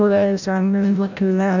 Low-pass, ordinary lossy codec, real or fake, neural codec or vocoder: 7.2 kHz; none; fake; codec, 16 kHz, 0.5 kbps, FreqCodec, larger model